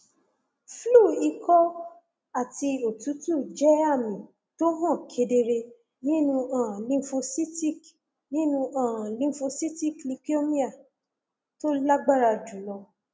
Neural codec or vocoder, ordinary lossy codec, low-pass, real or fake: none; none; none; real